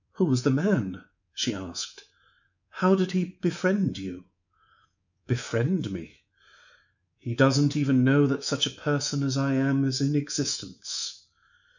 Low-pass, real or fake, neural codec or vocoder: 7.2 kHz; fake; codec, 24 kHz, 3.1 kbps, DualCodec